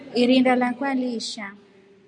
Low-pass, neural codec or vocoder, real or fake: 10.8 kHz; none; real